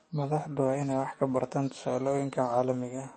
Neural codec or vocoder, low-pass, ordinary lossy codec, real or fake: codec, 44.1 kHz, 7.8 kbps, DAC; 9.9 kHz; MP3, 32 kbps; fake